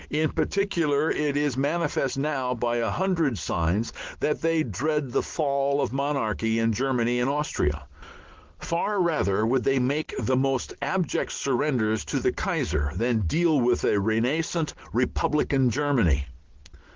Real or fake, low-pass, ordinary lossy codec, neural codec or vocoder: real; 7.2 kHz; Opus, 16 kbps; none